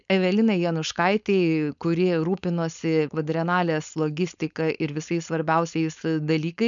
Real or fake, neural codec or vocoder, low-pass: fake; codec, 16 kHz, 4.8 kbps, FACodec; 7.2 kHz